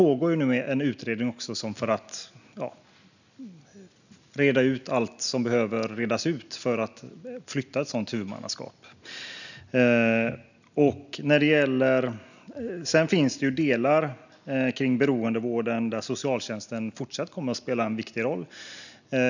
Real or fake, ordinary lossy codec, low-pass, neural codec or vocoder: real; none; 7.2 kHz; none